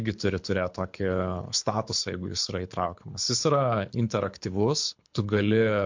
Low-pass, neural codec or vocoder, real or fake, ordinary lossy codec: 7.2 kHz; codec, 24 kHz, 6 kbps, HILCodec; fake; MP3, 48 kbps